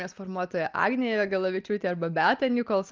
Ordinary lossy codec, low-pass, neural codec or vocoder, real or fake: Opus, 32 kbps; 7.2 kHz; none; real